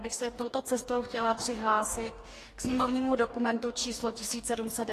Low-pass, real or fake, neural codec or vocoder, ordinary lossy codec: 14.4 kHz; fake; codec, 44.1 kHz, 2.6 kbps, DAC; AAC, 48 kbps